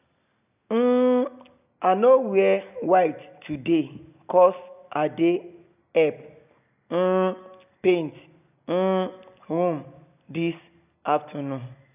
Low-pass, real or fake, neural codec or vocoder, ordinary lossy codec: 3.6 kHz; real; none; none